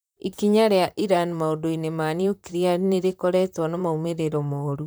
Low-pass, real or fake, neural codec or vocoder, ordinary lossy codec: none; fake; vocoder, 44.1 kHz, 128 mel bands, Pupu-Vocoder; none